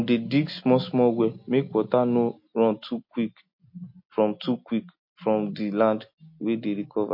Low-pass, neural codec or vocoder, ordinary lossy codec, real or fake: 5.4 kHz; none; MP3, 32 kbps; real